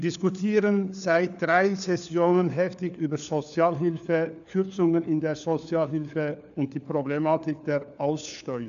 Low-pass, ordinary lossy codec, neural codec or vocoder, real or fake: 7.2 kHz; none; codec, 16 kHz, 4 kbps, FunCodec, trained on LibriTTS, 50 frames a second; fake